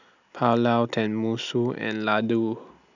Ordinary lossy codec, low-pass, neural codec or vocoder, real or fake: none; 7.2 kHz; none; real